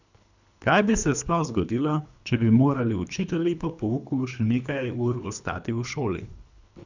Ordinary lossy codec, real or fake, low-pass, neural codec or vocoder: none; fake; 7.2 kHz; codec, 24 kHz, 3 kbps, HILCodec